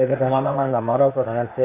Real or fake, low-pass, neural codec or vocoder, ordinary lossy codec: fake; 3.6 kHz; codec, 16 kHz, 0.8 kbps, ZipCodec; AAC, 32 kbps